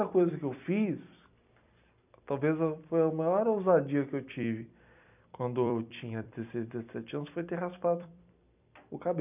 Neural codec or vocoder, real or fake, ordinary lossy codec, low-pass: vocoder, 44.1 kHz, 128 mel bands every 256 samples, BigVGAN v2; fake; none; 3.6 kHz